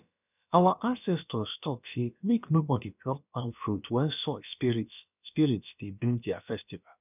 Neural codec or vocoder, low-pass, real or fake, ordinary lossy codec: codec, 16 kHz, about 1 kbps, DyCAST, with the encoder's durations; 3.6 kHz; fake; none